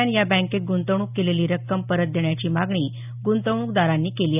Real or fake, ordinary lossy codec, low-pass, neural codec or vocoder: real; none; 3.6 kHz; none